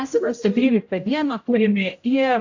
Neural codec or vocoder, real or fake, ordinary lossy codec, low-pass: codec, 16 kHz, 0.5 kbps, X-Codec, HuBERT features, trained on general audio; fake; AAC, 48 kbps; 7.2 kHz